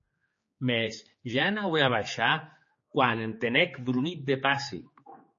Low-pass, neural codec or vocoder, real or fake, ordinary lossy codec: 7.2 kHz; codec, 16 kHz, 4 kbps, X-Codec, HuBERT features, trained on general audio; fake; MP3, 32 kbps